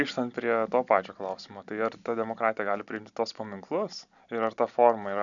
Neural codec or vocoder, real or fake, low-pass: none; real; 7.2 kHz